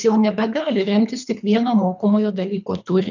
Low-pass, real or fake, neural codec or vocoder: 7.2 kHz; fake; codec, 24 kHz, 3 kbps, HILCodec